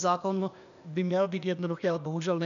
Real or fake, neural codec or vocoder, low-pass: fake; codec, 16 kHz, 0.8 kbps, ZipCodec; 7.2 kHz